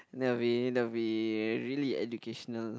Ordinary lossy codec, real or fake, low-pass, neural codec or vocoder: none; real; none; none